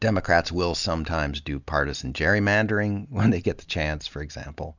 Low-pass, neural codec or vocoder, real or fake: 7.2 kHz; none; real